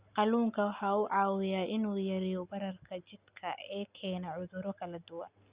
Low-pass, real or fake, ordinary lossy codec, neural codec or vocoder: 3.6 kHz; real; Opus, 64 kbps; none